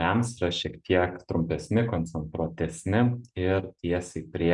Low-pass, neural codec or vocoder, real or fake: 10.8 kHz; none; real